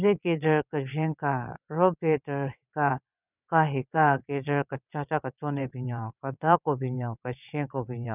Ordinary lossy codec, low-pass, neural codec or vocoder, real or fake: none; 3.6 kHz; vocoder, 22.05 kHz, 80 mel bands, WaveNeXt; fake